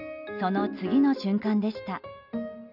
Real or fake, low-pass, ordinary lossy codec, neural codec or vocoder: real; 5.4 kHz; none; none